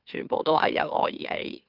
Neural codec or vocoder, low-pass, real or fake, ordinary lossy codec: autoencoder, 44.1 kHz, a latent of 192 numbers a frame, MeloTTS; 5.4 kHz; fake; Opus, 24 kbps